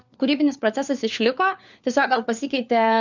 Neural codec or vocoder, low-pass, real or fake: codec, 16 kHz, 4 kbps, FunCodec, trained on LibriTTS, 50 frames a second; 7.2 kHz; fake